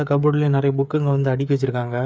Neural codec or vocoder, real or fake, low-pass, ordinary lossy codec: codec, 16 kHz, 8 kbps, FreqCodec, smaller model; fake; none; none